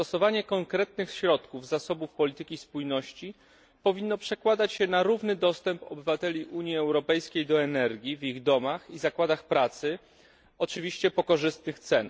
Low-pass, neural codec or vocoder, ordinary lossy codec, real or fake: none; none; none; real